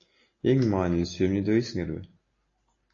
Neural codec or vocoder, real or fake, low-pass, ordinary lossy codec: none; real; 7.2 kHz; AAC, 32 kbps